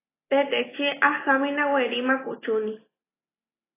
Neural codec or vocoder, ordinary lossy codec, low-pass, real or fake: none; AAC, 16 kbps; 3.6 kHz; real